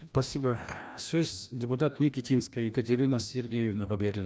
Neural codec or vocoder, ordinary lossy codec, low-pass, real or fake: codec, 16 kHz, 1 kbps, FreqCodec, larger model; none; none; fake